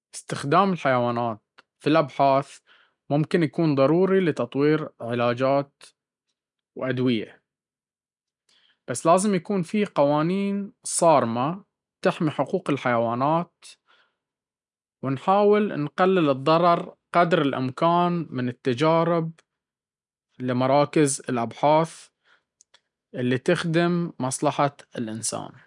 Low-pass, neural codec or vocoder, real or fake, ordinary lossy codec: 10.8 kHz; none; real; none